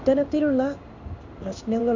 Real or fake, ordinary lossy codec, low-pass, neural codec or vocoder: fake; none; 7.2 kHz; codec, 16 kHz in and 24 kHz out, 1 kbps, XY-Tokenizer